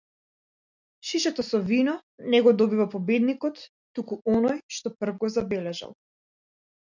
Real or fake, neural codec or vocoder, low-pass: real; none; 7.2 kHz